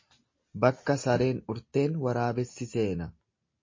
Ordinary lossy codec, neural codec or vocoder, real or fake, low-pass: MP3, 32 kbps; none; real; 7.2 kHz